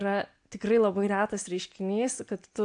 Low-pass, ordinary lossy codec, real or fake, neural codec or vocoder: 9.9 kHz; AAC, 64 kbps; real; none